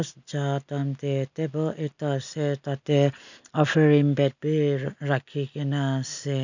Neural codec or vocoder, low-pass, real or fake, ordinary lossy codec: none; 7.2 kHz; real; none